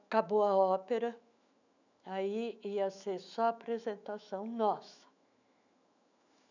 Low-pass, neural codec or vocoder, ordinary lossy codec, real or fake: 7.2 kHz; autoencoder, 48 kHz, 128 numbers a frame, DAC-VAE, trained on Japanese speech; none; fake